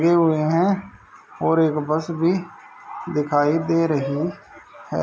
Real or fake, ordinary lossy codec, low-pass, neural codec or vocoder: real; none; none; none